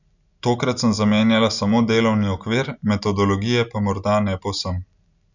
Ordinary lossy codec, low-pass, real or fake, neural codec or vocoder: none; 7.2 kHz; real; none